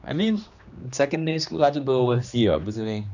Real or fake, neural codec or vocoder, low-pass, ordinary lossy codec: fake; codec, 16 kHz, 2 kbps, X-Codec, HuBERT features, trained on general audio; 7.2 kHz; none